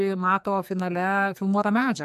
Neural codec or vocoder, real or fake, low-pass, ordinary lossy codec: codec, 44.1 kHz, 2.6 kbps, SNAC; fake; 14.4 kHz; AAC, 96 kbps